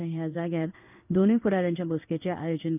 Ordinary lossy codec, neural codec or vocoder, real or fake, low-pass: none; codec, 16 kHz in and 24 kHz out, 1 kbps, XY-Tokenizer; fake; 3.6 kHz